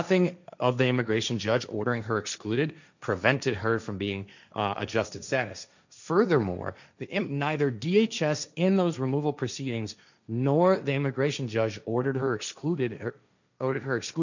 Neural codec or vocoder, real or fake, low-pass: codec, 16 kHz, 1.1 kbps, Voila-Tokenizer; fake; 7.2 kHz